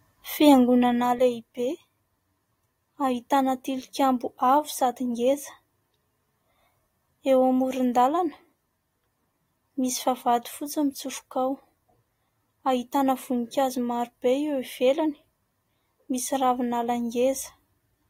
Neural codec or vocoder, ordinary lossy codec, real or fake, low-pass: none; AAC, 48 kbps; real; 19.8 kHz